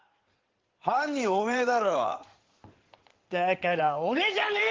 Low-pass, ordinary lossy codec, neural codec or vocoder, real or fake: 7.2 kHz; Opus, 16 kbps; codec, 16 kHz in and 24 kHz out, 2.2 kbps, FireRedTTS-2 codec; fake